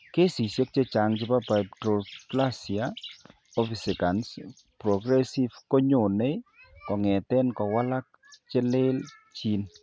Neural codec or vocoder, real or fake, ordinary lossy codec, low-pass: none; real; none; none